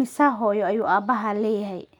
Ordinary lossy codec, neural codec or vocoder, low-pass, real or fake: none; none; 19.8 kHz; real